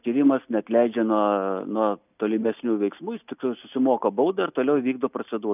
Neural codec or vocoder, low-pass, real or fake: none; 3.6 kHz; real